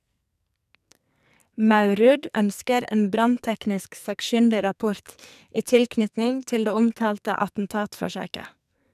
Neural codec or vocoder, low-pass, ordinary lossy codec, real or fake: codec, 44.1 kHz, 2.6 kbps, SNAC; 14.4 kHz; none; fake